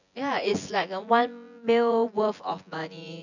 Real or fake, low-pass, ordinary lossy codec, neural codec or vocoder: fake; 7.2 kHz; none; vocoder, 24 kHz, 100 mel bands, Vocos